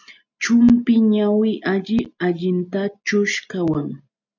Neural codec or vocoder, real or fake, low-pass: none; real; 7.2 kHz